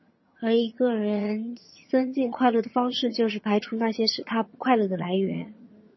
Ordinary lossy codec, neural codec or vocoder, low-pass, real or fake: MP3, 24 kbps; vocoder, 22.05 kHz, 80 mel bands, HiFi-GAN; 7.2 kHz; fake